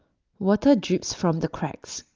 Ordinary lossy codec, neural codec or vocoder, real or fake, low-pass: Opus, 32 kbps; none; real; 7.2 kHz